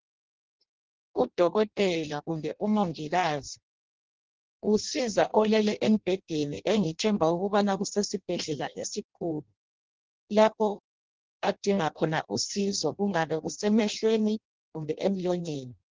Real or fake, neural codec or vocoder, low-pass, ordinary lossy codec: fake; codec, 16 kHz in and 24 kHz out, 0.6 kbps, FireRedTTS-2 codec; 7.2 kHz; Opus, 16 kbps